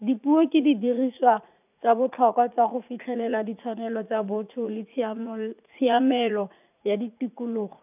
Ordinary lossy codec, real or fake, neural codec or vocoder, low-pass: none; fake; vocoder, 44.1 kHz, 80 mel bands, Vocos; 3.6 kHz